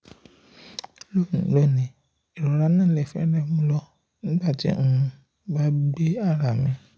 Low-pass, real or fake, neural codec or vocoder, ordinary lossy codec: none; real; none; none